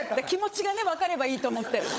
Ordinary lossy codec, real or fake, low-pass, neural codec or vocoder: none; fake; none; codec, 16 kHz, 16 kbps, FunCodec, trained on LibriTTS, 50 frames a second